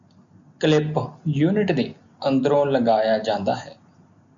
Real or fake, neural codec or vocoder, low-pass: real; none; 7.2 kHz